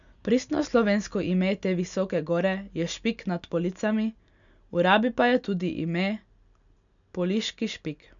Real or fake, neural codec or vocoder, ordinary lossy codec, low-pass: real; none; none; 7.2 kHz